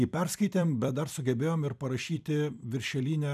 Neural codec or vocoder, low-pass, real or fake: vocoder, 44.1 kHz, 128 mel bands every 256 samples, BigVGAN v2; 14.4 kHz; fake